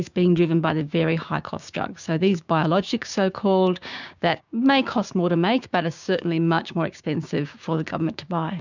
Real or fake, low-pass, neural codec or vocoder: fake; 7.2 kHz; codec, 16 kHz, 6 kbps, DAC